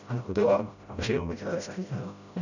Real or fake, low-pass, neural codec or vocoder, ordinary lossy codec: fake; 7.2 kHz; codec, 16 kHz, 0.5 kbps, FreqCodec, smaller model; none